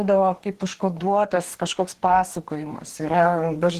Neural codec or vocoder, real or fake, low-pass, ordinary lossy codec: codec, 44.1 kHz, 2.6 kbps, DAC; fake; 14.4 kHz; Opus, 16 kbps